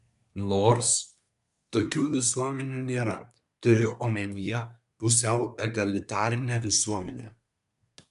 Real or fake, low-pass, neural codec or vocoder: fake; 10.8 kHz; codec, 24 kHz, 1 kbps, SNAC